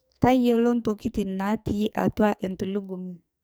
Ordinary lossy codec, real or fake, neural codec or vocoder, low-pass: none; fake; codec, 44.1 kHz, 2.6 kbps, SNAC; none